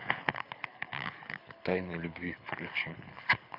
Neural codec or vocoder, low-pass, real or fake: codec, 24 kHz, 6 kbps, HILCodec; 5.4 kHz; fake